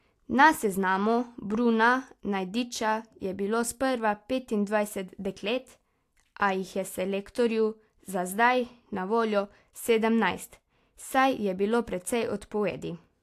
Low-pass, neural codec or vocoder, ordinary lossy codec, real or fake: 14.4 kHz; none; AAC, 64 kbps; real